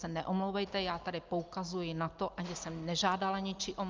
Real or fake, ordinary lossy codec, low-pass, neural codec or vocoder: real; Opus, 24 kbps; 7.2 kHz; none